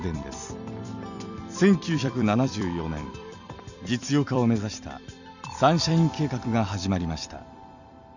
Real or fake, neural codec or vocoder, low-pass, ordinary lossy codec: fake; vocoder, 44.1 kHz, 128 mel bands every 256 samples, BigVGAN v2; 7.2 kHz; none